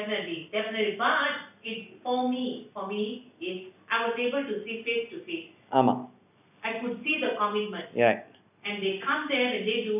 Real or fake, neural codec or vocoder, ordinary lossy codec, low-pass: real; none; none; 3.6 kHz